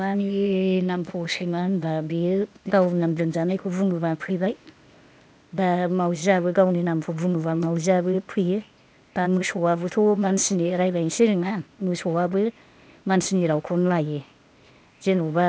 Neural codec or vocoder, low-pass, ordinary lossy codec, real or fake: codec, 16 kHz, 0.8 kbps, ZipCodec; none; none; fake